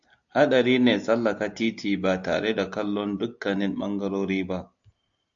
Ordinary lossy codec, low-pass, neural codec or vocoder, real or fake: AAC, 64 kbps; 7.2 kHz; none; real